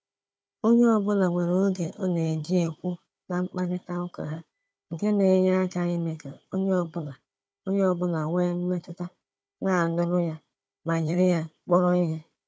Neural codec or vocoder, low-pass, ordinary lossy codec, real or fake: codec, 16 kHz, 4 kbps, FunCodec, trained on Chinese and English, 50 frames a second; none; none; fake